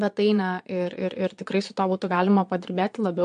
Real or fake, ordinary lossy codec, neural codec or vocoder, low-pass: fake; MP3, 64 kbps; vocoder, 22.05 kHz, 80 mel bands, Vocos; 9.9 kHz